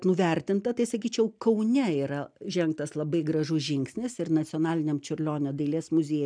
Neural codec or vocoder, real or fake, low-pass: none; real; 9.9 kHz